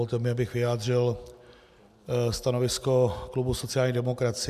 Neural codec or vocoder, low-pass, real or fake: none; 14.4 kHz; real